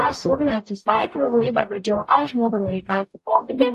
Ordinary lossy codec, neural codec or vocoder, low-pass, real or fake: AAC, 64 kbps; codec, 44.1 kHz, 0.9 kbps, DAC; 14.4 kHz; fake